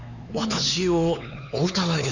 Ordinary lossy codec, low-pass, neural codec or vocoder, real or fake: none; 7.2 kHz; codec, 16 kHz, 4 kbps, X-Codec, HuBERT features, trained on LibriSpeech; fake